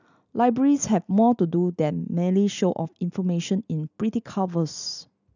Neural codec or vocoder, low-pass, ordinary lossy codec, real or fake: none; 7.2 kHz; none; real